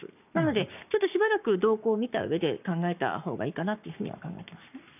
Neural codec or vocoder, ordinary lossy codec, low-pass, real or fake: codec, 44.1 kHz, 7.8 kbps, Pupu-Codec; none; 3.6 kHz; fake